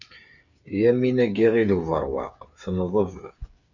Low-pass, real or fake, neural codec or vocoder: 7.2 kHz; fake; codec, 16 kHz, 8 kbps, FreqCodec, smaller model